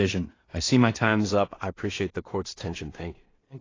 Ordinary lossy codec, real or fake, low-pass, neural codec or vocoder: AAC, 32 kbps; fake; 7.2 kHz; codec, 16 kHz in and 24 kHz out, 0.4 kbps, LongCat-Audio-Codec, two codebook decoder